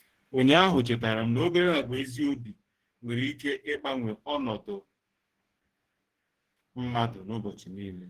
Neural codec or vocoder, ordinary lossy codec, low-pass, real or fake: codec, 44.1 kHz, 2.6 kbps, DAC; Opus, 16 kbps; 14.4 kHz; fake